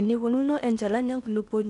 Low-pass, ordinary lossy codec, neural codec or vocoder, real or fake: 10.8 kHz; none; codec, 16 kHz in and 24 kHz out, 0.8 kbps, FocalCodec, streaming, 65536 codes; fake